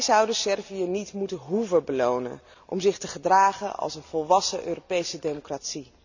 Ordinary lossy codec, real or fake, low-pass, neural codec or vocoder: none; real; 7.2 kHz; none